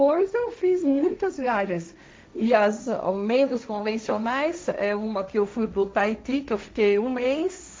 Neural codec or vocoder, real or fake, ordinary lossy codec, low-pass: codec, 16 kHz, 1.1 kbps, Voila-Tokenizer; fake; none; none